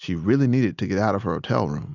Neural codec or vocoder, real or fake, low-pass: none; real; 7.2 kHz